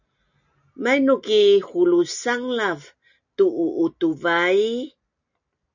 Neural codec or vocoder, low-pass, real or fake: none; 7.2 kHz; real